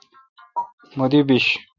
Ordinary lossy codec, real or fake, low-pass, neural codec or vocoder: Opus, 64 kbps; real; 7.2 kHz; none